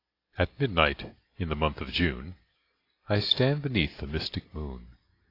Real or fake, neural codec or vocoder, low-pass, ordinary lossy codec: real; none; 5.4 kHz; AAC, 32 kbps